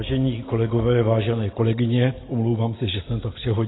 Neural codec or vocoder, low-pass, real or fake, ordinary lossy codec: none; 7.2 kHz; real; AAC, 16 kbps